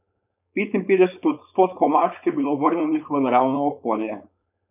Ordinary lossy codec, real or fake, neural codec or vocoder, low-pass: none; fake; codec, 16 kHz, 4.8 kbps, FACodec; 3.6 kHz